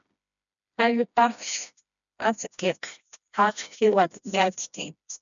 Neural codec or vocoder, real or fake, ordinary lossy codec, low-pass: codec, 16 kHz, 1 kbps, FreqCodec, smaller model; fake; MP3, 96 kbps; 7.2 kHz